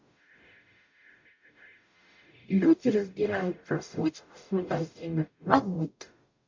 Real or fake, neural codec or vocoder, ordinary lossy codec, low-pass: fake; codec, 44.1 kHz, 0.9 kbps, DAC; MP3, 48 kbps; 7.2 kHz